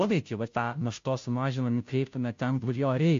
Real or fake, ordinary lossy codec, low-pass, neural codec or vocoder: fake; MP3, 48 kbps; 7.2 kHz; codec, 16 kHz, 0.5 kbps, FunCodec, trained on Chinese and English, 25 frames a second